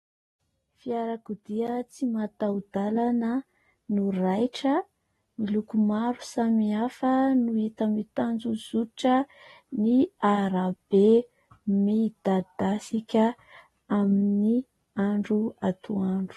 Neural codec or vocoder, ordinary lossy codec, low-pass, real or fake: none; AAC, 32 kbps; 19.8 kHz; real